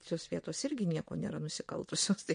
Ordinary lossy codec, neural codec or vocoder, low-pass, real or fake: MP3, 48 kbps; vocoder, 22.05 kHz, 80 mel bands, WaveNeXt; 9.9 kHz; fake